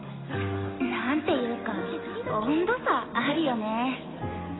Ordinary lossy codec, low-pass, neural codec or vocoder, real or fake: AAC, 16 kbps; 7.2 kHz; none; real